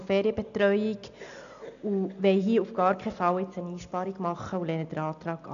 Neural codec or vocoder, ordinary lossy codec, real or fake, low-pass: none; none; real; 7.2 kHz